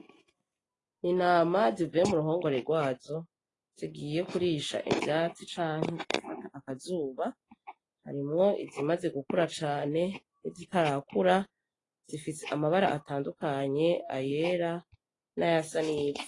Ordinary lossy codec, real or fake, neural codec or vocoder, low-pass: AAC, 32 kbps; real; none; 10.8 kHz